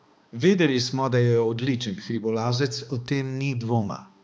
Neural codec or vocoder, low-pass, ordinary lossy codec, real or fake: codec, 16 kHz, 2 kbps, X-Codec, HuBERT features, trained on balanced general audio; none; none; fake